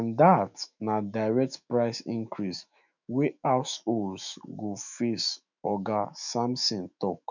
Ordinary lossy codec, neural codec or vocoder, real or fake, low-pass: none; autoencoder, 48 kHz, 128 numbers a frame, DAC-VAE, trained on Japanese speech; fake; 7.2 kHz